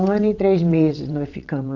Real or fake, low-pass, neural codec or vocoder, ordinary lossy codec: fake; 7.2 kHz; vocoder, 22.05 kHz, 80 mel bands, WaveNeXt; none